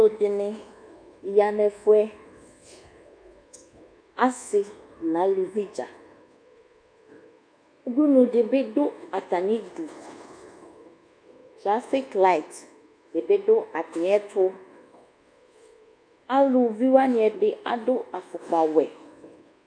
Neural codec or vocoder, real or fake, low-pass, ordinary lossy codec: codec, 24 kHz, 1.2 kbps, DualCodec; fake; 9.9 kHz; MP3, 96 kbps